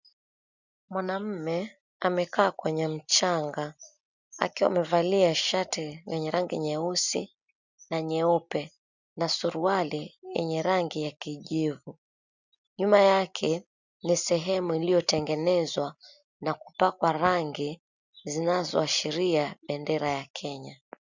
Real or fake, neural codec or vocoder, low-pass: real; none; 7.2 kHz